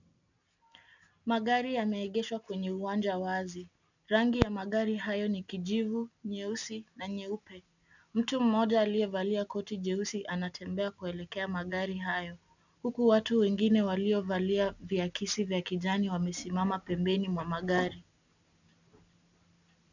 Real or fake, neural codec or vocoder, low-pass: real; none; 7.2 kHz